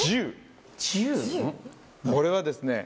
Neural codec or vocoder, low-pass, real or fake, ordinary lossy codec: none; none; real; none